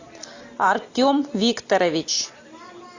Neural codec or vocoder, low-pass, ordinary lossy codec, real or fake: none; 7.2 kHz; AAC, 48 kbps; real